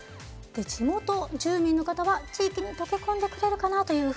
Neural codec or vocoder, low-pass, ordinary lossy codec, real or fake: none; none; none; real